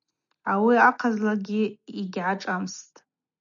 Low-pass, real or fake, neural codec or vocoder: 7.2 kHz; real; none